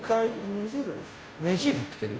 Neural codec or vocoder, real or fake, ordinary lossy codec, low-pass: codec, 16 kHz, 0.5 kbps, FunCodec, trained on Chinese and English, 25 frames a second; fake; none; none